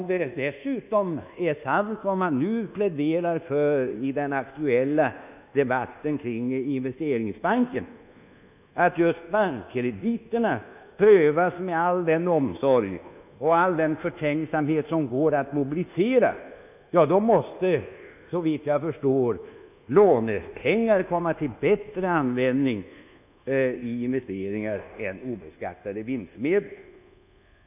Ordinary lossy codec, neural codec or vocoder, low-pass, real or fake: none; codec, 24 kHz, 1.2 kbps, DualCodec; 3.6 kHz; fake